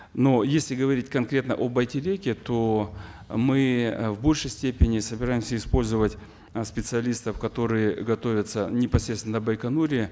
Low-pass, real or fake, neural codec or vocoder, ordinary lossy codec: none; real; none; none